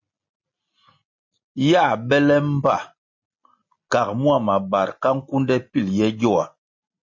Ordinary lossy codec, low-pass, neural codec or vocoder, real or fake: MP3, 32 kbps; 7.2 kHz; none; real